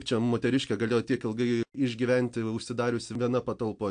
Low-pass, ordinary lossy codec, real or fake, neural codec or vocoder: 9.9 kHz; AAC, 64 kbps; real; none